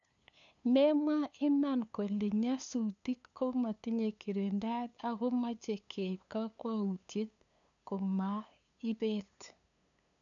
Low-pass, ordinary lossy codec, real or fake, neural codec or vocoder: 7.2 kHz; none; fake; codec, 16 kHz, 2 kbps, FunCodec, trained on LibriTTS, 25 frames a second